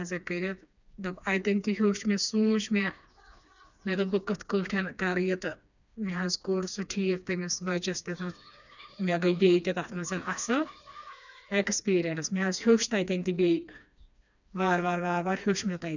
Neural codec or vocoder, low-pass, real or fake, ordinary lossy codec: codec, 16 kHz, 2 kbps, FreqCodec, smaller model; 7.2 kHz; fake; none